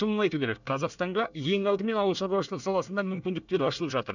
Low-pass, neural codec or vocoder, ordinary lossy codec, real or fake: 7.2 kHz; codec, 24 kHz, 1 kbps, SNAC; none; fake